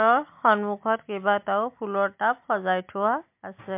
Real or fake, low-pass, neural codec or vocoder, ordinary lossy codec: real; 3.6 kHz; none; MP3, 32 kbps